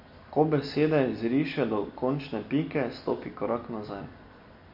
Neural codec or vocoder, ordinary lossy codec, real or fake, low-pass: none; MP3, 32 kbps; real; 5.4 kHz